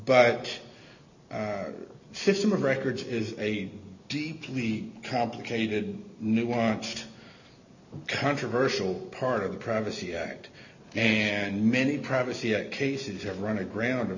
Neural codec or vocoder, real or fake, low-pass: none; real; 7.2 kHz